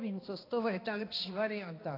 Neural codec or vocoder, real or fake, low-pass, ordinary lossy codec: codec, 16 kHz, 2 kbps, X-Codec, HuBERT features, trained on balanced general audio; fake; 5.4 kHz; AAC, 24 kbps